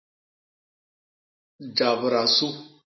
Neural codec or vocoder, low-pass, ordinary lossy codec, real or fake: none; 7.2 kHz; MP3, 24 kbps; real